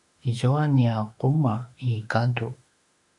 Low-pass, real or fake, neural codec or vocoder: 10.8 kHz; fake; autoencoder, 48 kHz, 32 numbers a frame, DAC-VAE, trained on Japanese speech